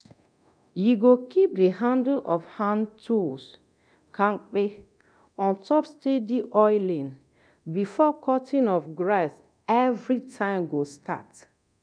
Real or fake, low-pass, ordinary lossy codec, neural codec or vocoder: fake; 9.9 kHz; none; codec, 24 kHz, 0.9 kbps, DualCodec